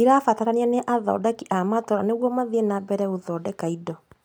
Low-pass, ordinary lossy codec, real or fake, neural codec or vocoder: none; none; real; none